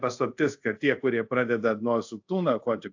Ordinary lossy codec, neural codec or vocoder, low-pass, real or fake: AAC, 48 kbps; codec, 24 kHz, 0.5 kbps, DualCodec; 7.2 kHz; fake